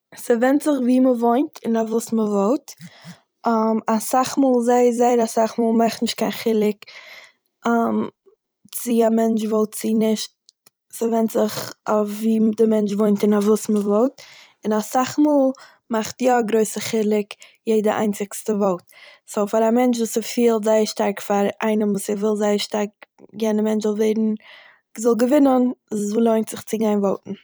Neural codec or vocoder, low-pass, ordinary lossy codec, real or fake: vocoder, 44.1 kHz, 128 mel bands every 256 samples, BigVGAN v2; none; none; fake